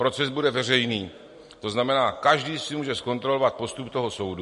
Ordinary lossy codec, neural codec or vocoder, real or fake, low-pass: MP3, 48 kbps; none; real; 14.4 kHz